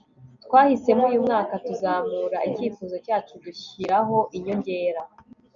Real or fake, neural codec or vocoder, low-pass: real; none; 7.2 kHz